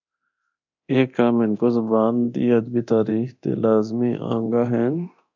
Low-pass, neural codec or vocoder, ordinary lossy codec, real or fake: 7.2 kHz; codec, 24 kHz, 0.9 kbps, DualCodec; MP3, 64 kbps; fake